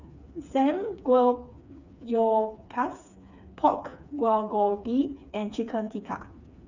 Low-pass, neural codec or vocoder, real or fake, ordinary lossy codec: 7.2 kHz; codec, 16 kHz, 4 kbps, FreqCodec, smaller model; fake; none